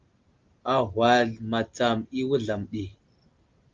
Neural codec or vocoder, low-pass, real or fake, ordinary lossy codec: none; 7.2 kHz; real; Opus, 32 kbps